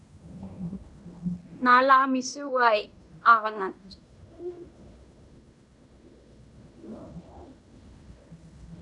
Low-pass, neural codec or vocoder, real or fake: 10.8 kHz; codec, 16 kHz in and 24 kHz out, 0.9 kbps, LongCat-Audio-Codec, fine tuned four codebook decoder; fake